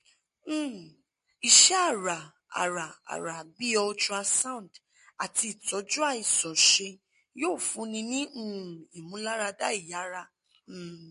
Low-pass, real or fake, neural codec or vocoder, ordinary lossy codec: 10.8 kHz; real; none; MP3, 48 kbps